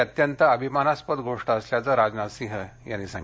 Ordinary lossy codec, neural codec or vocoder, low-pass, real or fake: none; none; none; real